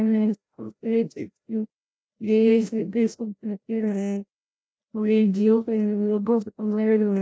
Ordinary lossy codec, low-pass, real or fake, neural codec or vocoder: none; none; fake; codec, 16 kHz, 0.5 kbps, FreqCodec, larger model